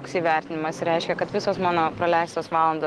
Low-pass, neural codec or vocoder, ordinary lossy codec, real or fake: 9.9 kHz; none; Opus, 16 kbps; real